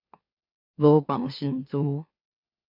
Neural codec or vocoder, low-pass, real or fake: autoencoder, 44.1 kHz, a latent of 192 numbers a frame, MeloTTS; 5.4 kHz; fake